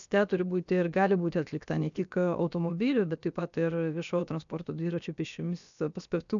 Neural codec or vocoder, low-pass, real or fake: codec, 16 kHz, about 1 kbps, DyCAST, with the encoder's durations; 7.2 kHz; fake